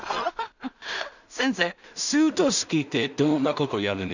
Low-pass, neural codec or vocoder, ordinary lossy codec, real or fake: 7.2 kHz; codec, 16 kHz in and 24 kHz out, 0.4 kbps, LongCat-Audio-Codec, two codebook decoder; none; fake